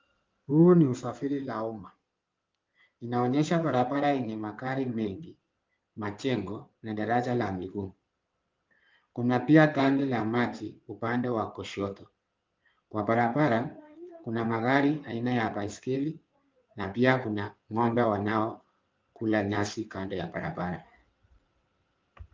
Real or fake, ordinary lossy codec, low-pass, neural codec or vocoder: fake; Opus, 24 kbps; 7.2 kHz; codec, 16 kHz in and 24 kHz out, 2.2 kbps, FireRedTTS-2 codec